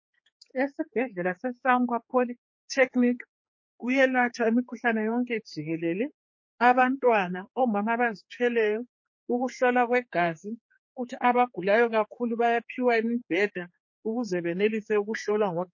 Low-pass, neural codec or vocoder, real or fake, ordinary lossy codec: 7.2 kHz; codec, 16 kHz, 4 kbps, X-Codec, HuBERT features, trained on general audio; fake; MP3, 32 kbps